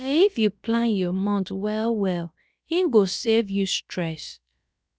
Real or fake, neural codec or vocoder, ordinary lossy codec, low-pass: fake; codec, 16 kHz, about 1 kbps, DyCAST, with the encoder's durations; none; none